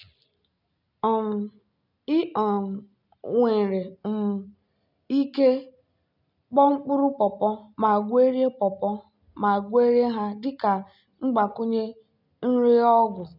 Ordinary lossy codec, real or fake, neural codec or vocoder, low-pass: none; real; none; 5.4 kHz